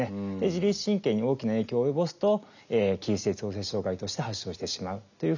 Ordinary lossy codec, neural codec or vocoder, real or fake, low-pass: none; none; real; 7.2 kHz